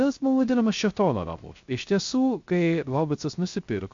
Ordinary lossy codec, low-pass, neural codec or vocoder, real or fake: MP3, 48 kbps; 7.2 kHz; codec, 16 kHz, 0.3 kbps, FocalCodec; fake